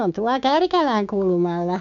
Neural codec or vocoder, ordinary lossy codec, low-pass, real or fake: codec, 16 kHz, 6 kbps, DAC; MP3, 64 kbps; 7.2 kHz; fake